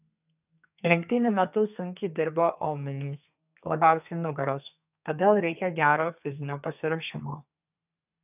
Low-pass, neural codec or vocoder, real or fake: 3.6 kHz; codec, 32 kHz, 1.9 kbps, SNAC; fake